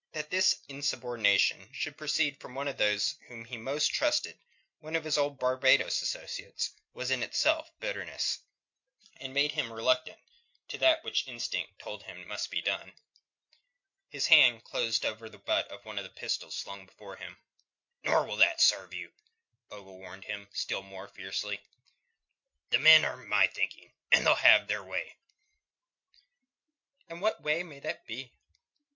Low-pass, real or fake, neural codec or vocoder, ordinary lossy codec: 7.2 kHz; real; none; MP3, 48 kbps